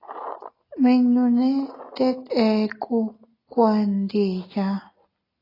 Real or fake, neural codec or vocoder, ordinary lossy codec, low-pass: real; none; AAC, 24 kbps; 5.4 kHz